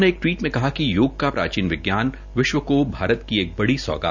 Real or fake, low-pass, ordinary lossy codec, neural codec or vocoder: real; 7.2 kHz; none; none